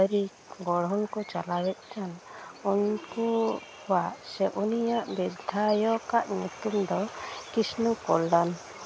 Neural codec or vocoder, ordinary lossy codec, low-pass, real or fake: none; none; none; real